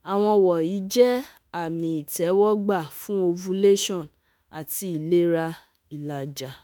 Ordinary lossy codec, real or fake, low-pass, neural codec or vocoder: none; fake; none; autoencoder, 48 kHz, 32 numbers a frame, DAC-VAE, trained on Japanese speech